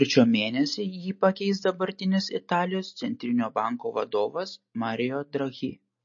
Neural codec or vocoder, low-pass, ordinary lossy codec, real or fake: none; 7.2 kHz; MP3, 32 kbps; real